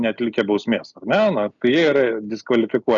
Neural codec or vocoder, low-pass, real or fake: none; 7.2 kHz; real